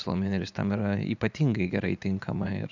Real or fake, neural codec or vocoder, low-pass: fake; vocoder, 44.1 kHz, 80 mel bands, Vocos; 7.2 kHz